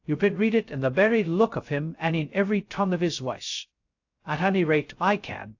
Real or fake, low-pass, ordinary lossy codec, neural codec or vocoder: fake; 7.2 kHz; AAC, 48 kbps; codec, 16 kHz, 0.2 kbps, FocalCodec